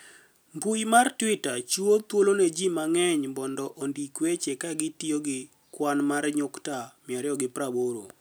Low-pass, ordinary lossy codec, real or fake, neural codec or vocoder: none; none; real; none